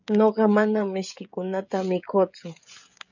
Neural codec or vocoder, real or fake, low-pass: codec, 16 kHz, 16 kbps, FreqCodec, smaller model; fake; 7.2 kHz